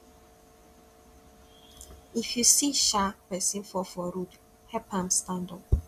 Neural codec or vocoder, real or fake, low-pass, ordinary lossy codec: none; real; 14.4 kHz; none